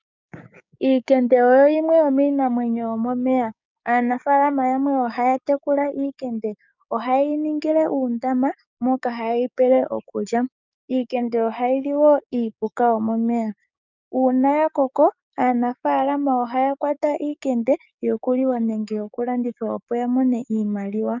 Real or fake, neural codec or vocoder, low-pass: fake; codec, 16 kHz, 6 kbps, DAC; 7.2 kHz